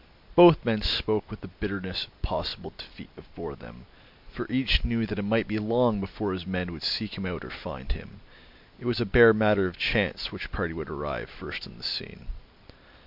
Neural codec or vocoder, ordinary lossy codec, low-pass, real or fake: none; MP3, 48 kbps; 5.4 kHz; real